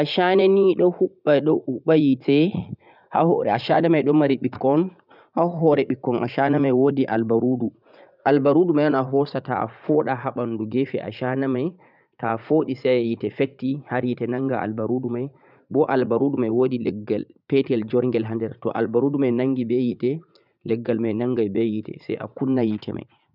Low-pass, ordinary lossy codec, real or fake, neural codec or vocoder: 5.4 kHz; none; fake; vocoder, 44.1 kHz, 80 mel bands, Vocos